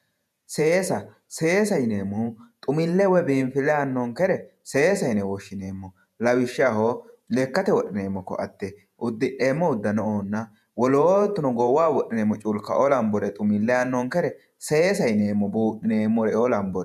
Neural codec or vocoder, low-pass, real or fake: vocoder, 48 kHz, 128 mel bands, Vocos; 14.4 kHz; fake